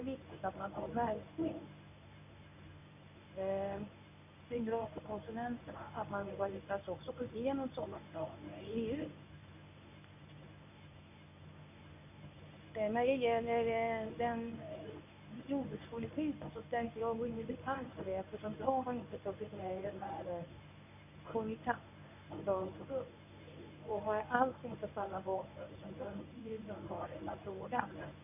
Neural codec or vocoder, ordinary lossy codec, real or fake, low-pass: codec, 24 kHz, 0.9 kbps, WavTokenizer, medium speech release version 1; none; fake; 3.6 kHz